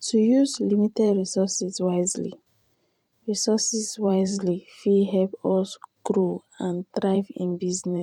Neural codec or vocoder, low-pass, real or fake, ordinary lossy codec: vocoder, 44.1 kHz, 128 mel bands every 512 samples, BigVGAN v2; 19.8 kHz; fake; MP3, 96 kbps